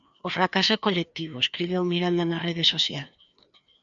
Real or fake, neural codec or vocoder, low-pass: fake; codec, 16 kHz, 2 kbps, FreqCodec, larger model; 7.2 kHz